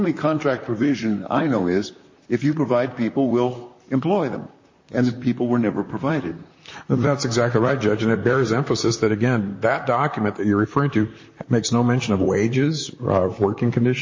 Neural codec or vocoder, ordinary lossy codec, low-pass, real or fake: vocoder, 44.1 kHz, 128 mel bands, Pupu-Vocoder; MP3, 32 kbps; 7.2 kHz; fake